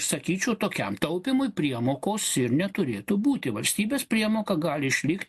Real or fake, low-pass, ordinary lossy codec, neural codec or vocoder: real; 14.4 kHz; MP3, 64 kbps; none